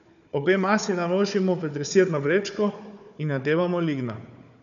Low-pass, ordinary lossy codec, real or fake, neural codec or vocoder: 7.2 kHz; none; fake; codec, 16 kHz, 4 kbps, FunCodec, trained on Chinese and English, 50 frames a second